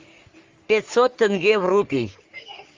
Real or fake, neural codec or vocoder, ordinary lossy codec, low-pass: fake; codec, 44.1 kHz, 3.4 kbps, Pupu-Codec; Opus, 32 kbps; 7.2 kHz